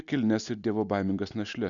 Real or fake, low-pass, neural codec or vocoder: real; 7.2 kHz; none